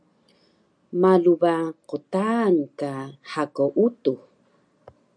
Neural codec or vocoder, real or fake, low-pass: none; real; 9.9 kHz